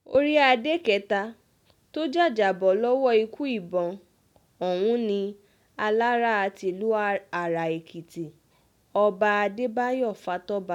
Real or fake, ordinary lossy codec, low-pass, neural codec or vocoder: real; none; 19.8 kHz; none